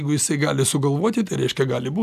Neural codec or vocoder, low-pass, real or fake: none; 14.4 kHz; real